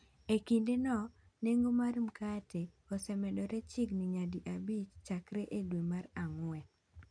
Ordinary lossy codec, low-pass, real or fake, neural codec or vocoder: none; 9.9 kHz; real; none